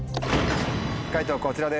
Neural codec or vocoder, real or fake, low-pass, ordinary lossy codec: none; real; none; none